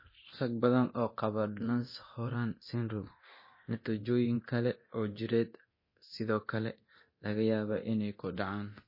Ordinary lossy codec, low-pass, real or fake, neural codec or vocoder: MP3, 24 kbps; 5.4 kHz; fake; codec, 24 kHz, 0.9 kbps, DualCodec